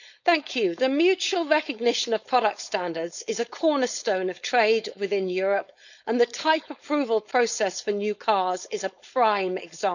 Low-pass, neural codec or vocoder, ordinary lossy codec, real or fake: 7.2 kHz; codec, 16 kHz, 4.8 kbps, FACodec; none; fake